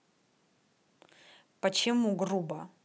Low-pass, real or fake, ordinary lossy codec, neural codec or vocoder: none; real; none; none